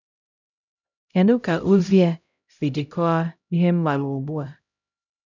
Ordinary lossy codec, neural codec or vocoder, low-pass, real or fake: none; codec, 16 kHz, 0.5 kbps, X-Codec, HuBERT features, trained on LibriSpeech; 7.2 kHz; fake